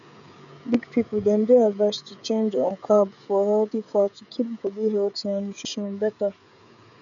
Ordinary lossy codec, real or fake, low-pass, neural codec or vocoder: none; fake; 7.2 kHz; codec, 16 kHz, 16 kbps, FreqCodec, smaller model